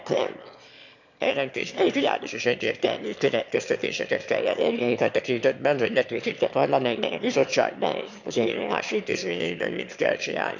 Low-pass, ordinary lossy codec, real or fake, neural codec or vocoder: 7.2 kHz; none; fake; autoencoder, 22.05 kHz, a latent of 192 numbers a frame, VITS, trained on one speaker